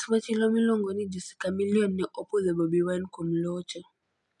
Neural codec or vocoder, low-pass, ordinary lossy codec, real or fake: none; 10.8 kHz; none; real